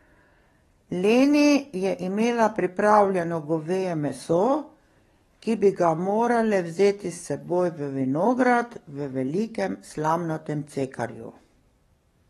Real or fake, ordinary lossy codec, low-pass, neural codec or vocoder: fake; AAC, 32 kbps; 19.8 kHz; codec, 44.1 kHz, 7.8 kbps, DAC